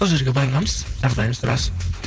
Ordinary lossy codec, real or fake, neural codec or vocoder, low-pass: none; fake; codec, 16 kHz, 4.8 kbps, FACodec; none